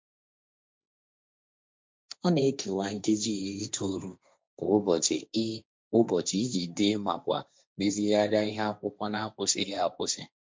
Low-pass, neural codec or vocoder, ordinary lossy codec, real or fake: none; codec, 16 kHz, 1.1 kbps, Voila-Tokenizer; none; fake